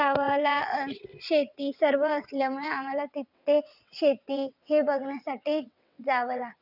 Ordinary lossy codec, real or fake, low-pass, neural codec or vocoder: none; fake; 5.4 kHz; vocoder, 22.05 kHz, 80 mel bands, WaveNeXt